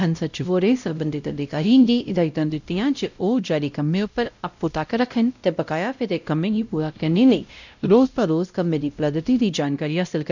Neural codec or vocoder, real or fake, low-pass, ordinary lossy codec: codec, 16 kHz, 0.5 kbps, X-Codec, WavLM features, trained on Multilingual LibriSpeech; fake; 7.2 kHz; none